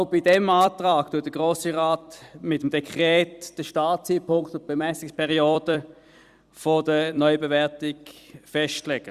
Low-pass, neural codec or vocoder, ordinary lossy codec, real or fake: 14.4 kHz; vocoder, 44.1 kHz, 128 mel bands every 256 samples, BigVGAN v2; Opus, 64 kbps; fake